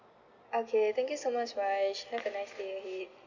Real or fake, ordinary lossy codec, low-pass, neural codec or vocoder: real; none; 7.2 kHz; none